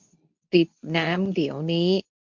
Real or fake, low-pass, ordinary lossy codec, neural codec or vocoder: fake; 7.2 kHz; MP3, 64 kbps; codec, 24 kHz, 0.9 kbps, WavTokenizer, medium speech release version 2